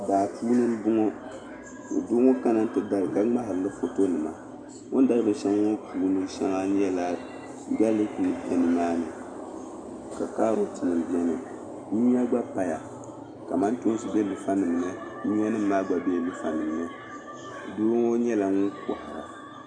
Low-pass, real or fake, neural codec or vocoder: 9.9 kHz; real; none